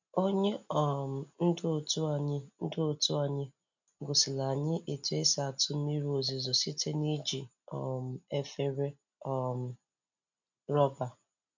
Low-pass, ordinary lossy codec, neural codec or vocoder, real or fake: 7.2 kHz; none; none; real